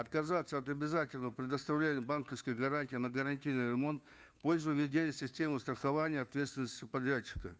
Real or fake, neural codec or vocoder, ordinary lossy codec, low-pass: fake; codec, 16 kHz, 2 kbps, FunCodec, trained on Chinese and English, 25 frames a second; none; none